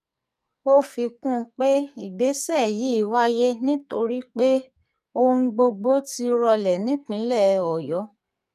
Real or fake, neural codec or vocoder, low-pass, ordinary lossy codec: fake; codec, 44.1 kHz, 2.6 kbps, SNAC; 14.4 kHz; none